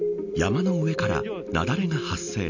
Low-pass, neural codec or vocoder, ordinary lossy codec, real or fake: 7.2 kHz; none; none; real